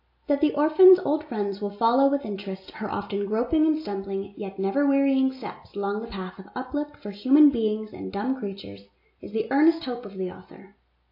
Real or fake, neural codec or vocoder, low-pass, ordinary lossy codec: real; none; 5.4 kHz; AAC, 32 kbps